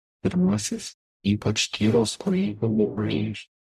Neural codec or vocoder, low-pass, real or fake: codec, 44.1 kHz, 0.9 kbps, DAC; 14.4 kHz; fake